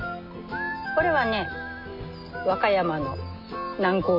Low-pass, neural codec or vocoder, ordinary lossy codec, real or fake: 5.4 kHz; none; MP3, 48 kbps; real